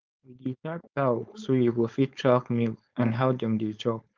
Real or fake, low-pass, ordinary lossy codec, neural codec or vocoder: fake; 7.2 kHz; Opus, 24 kbps; codec, 16 kHz, 4.8 kbps, FACodec